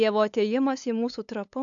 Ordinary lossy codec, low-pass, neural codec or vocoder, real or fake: AAC, 64 kbps; 7.2 kHz; codec, 16 kHz, 8 kbps, FunCodec, trained on LibriTTS, 25 frames a second; fake